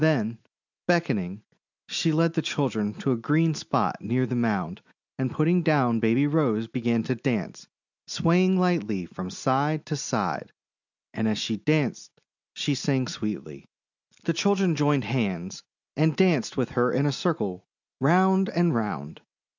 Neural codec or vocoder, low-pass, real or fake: none; 7.2 kHz; real